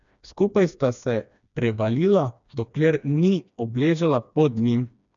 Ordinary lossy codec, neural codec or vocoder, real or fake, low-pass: none; codec, 16 kHz, 2 kbps, FreqCodec, smaller model; fake; 7.2 kHz